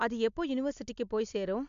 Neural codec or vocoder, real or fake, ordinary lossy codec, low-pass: none; real; MP3, 64 kbps; 7.2 kHz